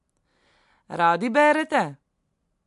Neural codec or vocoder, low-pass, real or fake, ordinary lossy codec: none; 10.8 kHz; real; MP3, 64 kbps